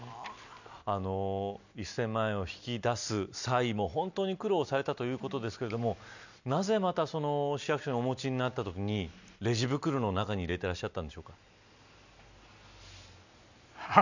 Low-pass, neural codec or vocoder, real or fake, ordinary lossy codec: 7.2 kHz; none; real; none